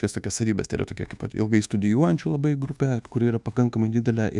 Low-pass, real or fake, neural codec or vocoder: 10.8 kHz; fake; codec, 24 kHz, 1.2 kbps, DualCodec